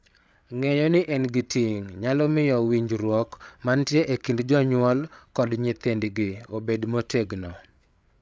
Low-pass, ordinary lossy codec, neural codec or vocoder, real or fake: none; none; codec, 16 kHz, 8 kbps, FreqCodec, larger model; fake